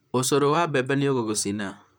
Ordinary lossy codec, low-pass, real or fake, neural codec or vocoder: none; none; fake; vocoder, 44.1 kHz, 128 mel bands, Pupu-Vocoder